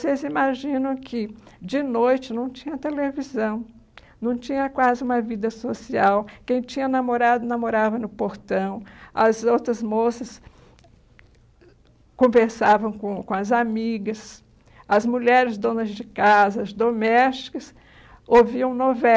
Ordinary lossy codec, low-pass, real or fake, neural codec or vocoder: none; none; real; none